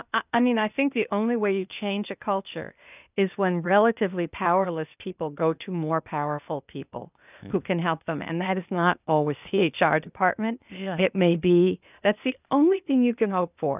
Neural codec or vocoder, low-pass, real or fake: codec, 16 kHz, 0.8 kbps, ZipCodec; 3.6 kHz; fake